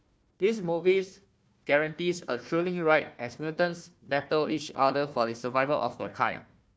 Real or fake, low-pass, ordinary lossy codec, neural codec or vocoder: fake; none; none; codec, 16 kHz, 1 kbps, FunCodec, trained on Chinese and English, 50 frames a second